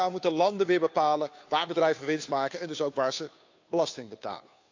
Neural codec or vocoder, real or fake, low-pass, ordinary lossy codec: codec, 16 kHz, 2 kbps, FunCodec, trained on Chinese and English, 25 frames a second; fake; 7.2 kHz; AAC, 48 kbps